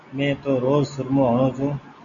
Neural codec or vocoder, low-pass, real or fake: none; 7.2 kHz; real